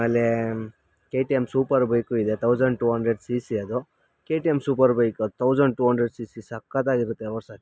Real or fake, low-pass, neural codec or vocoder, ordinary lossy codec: real; none; none; none